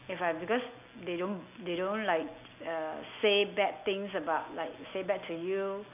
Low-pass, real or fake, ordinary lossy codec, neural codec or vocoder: 3.6 kHz; real; AAC, 32 kbps; none